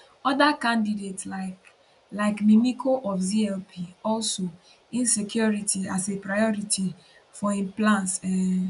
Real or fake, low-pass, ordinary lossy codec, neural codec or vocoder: real; 10.8 kHz; none; none